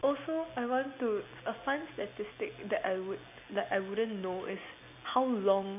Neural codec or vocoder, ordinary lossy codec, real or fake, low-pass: none; none; real; 3.6 kHz